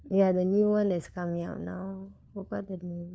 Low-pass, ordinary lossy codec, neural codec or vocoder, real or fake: none; none; codec, 16 kHz, 4 kbps, FunCodec, trained on LibriTTS, 50 frames a second; fake